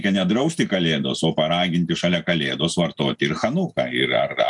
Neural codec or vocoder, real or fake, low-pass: none; real; 10.8 kHz